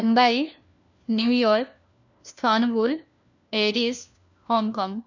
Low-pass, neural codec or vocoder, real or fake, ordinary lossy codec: 7.2 kHz; codec, 16 kHz, 1 kbps, FunCodec, trained on LibriTTS, 50 frames a second; fake; none